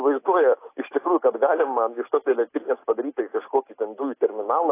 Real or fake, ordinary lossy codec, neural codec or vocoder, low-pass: real; AAC, 24 kbps; none; 3.6 kHz